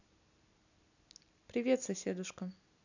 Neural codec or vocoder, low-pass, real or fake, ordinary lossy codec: none; 7.2 kHz; real; none